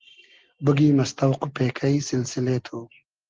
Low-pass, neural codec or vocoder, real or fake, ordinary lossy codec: 7.2 kHz; none; real; Opus, 16 kbps